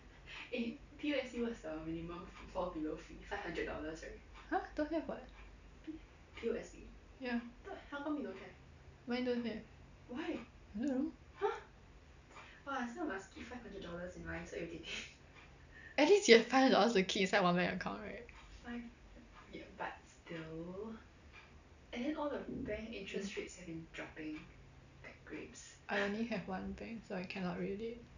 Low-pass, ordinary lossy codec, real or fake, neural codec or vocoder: 7.2 kHz; none; real; none